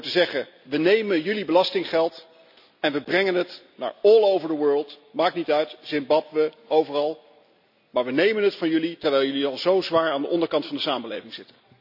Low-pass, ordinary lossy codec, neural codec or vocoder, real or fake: 5.4 kHz; none; none; real